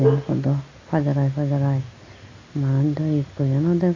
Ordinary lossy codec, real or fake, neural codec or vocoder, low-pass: AAC, 32 kbps; real; none; 7.2 kHz